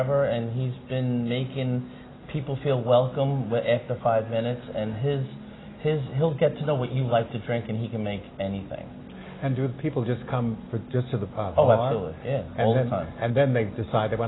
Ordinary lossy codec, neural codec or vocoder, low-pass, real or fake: AAC, 16 kbps; none; 7.2 kHz; real